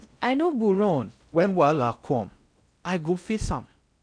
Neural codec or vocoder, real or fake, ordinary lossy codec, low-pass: codec, 16 kHz in and 24 kHz out, 0.6 kbps, FocalCodec, streaming, 4096 codes; fake; none; 9.9 kHz